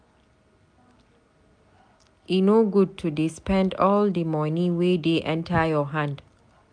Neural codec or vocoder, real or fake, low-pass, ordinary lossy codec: none; real; 9.9 kHz; MP3, 96 kbps